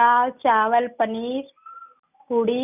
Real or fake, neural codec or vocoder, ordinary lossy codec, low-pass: real; none; none; 3.6 kHz